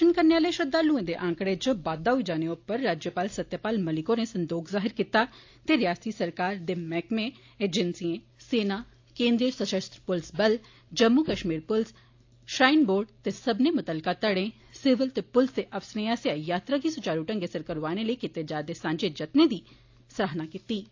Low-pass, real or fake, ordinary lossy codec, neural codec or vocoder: 7.2 kHz; real; AAC, 48 kbps; none